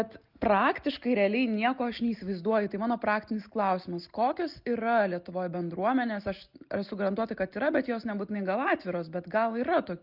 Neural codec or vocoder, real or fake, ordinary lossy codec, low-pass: none; real; Opus, 32 kbps; 5.4 kHz